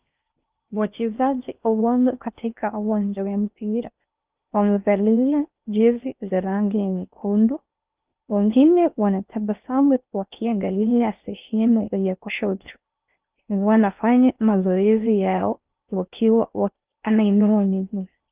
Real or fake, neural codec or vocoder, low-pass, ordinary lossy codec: fake; codec, 16 kHz in and 24 kHz out, 0.6 kbps, FocalCodec, streaming, 4096 codes; 3.6 kHz; Opus, 24 kbps